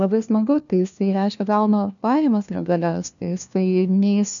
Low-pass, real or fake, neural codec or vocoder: 7.2 kHz; fake; codec, 16 kHz, 1 kbps, FunCodec, trained on LibriTTS, 50 frames a second